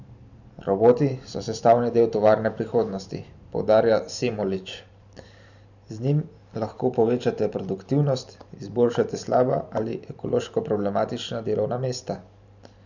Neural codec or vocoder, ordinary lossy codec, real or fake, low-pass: vocoder, 44.1 kHz, 128 mel bands every 512 samples, BigVGAN v2; none; fake; 7.2 kHz